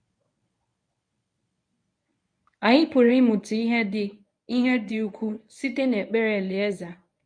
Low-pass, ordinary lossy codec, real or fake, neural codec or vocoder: 9.9 kHz; MP3, 48 kbps; fake; codec, 24 kHz, 0.9 kbps, WavTokenizer, medium speech release version 1